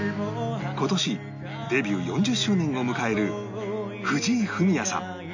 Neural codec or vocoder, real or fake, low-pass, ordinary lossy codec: none; real; 7.2 kHz; none